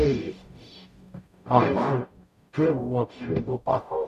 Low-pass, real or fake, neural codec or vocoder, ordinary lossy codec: 14.4 kHz; fake; codec, 44.1 kHz, 0.9 kbps, DAC; none